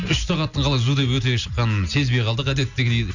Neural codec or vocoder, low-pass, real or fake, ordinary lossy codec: none; 7.2 kHz; real; none